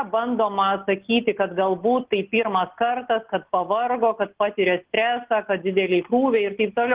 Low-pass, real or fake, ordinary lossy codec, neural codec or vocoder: 3.6 kHz; real; Opus, 16 kbps; none